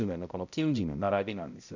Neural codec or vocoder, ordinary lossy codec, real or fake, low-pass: codec, 16 kHz, 0.5 kbps, X-Codec, HuBERT features, trained on balanced general audio; none; fake; 7.2 kHz